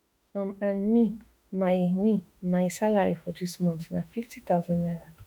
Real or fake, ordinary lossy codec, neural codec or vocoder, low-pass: fake; none; autoencoder, 48 kHz, 32 numbers a frame, DAC-VAE, trained on Japanese speech; none